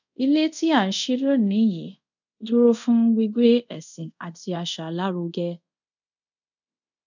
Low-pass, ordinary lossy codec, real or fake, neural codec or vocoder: 7.2 kHz; none; fake; codec, 24 kHz, 0.5 kbps, DualCodec